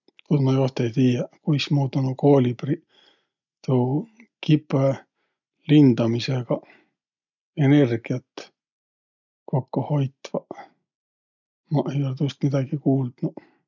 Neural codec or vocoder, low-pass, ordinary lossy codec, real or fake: none; 7.2 kHz; none; real